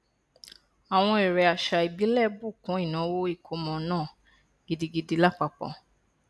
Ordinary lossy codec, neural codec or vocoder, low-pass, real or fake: none; none; none; real